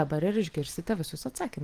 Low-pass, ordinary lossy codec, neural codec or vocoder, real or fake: 14.4 kHz; Opus, 24 kbps; none; real